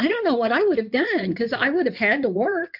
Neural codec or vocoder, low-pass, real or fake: codec, 16 kHz in and 24 kHz out, 2.2 kbps, FireRedTTS-2 codec; 5.4 kHz; fake